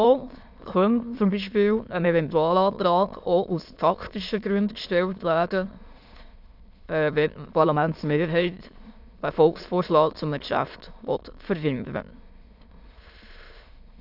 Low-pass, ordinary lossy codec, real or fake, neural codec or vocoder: 5.4 kHz; AAC, 48 kbps; fake; autoencoder, 22.05 kHz, a latent of 192 numbers a frame, VITS, trained on many speakers